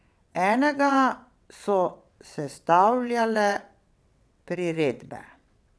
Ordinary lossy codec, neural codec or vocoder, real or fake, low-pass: none; vocoder, 22.05 kHz, 80 mel bands, WaveNeXt; fake; none